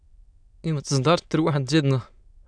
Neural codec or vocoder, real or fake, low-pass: autoencoder, 22.05 kHz, a latent of 192 numbers a frame, VITS, trained on many speakers; fake; 9.9 kHz